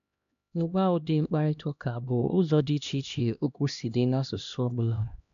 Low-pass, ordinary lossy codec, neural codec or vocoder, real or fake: 7.2 kHz; none; codec, 16 kHz, 1 kbps, X-Codec, HuBERT features, trained on LibriSpeech; fake